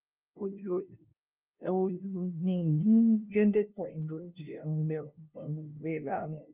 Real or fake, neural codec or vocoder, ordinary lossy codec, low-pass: fake; codec, 16 kHz, 0.5 kbps, FunCodec, trained on LibriTTS, 25 frames a second; Opus, 32 kbps; 3.6 kHz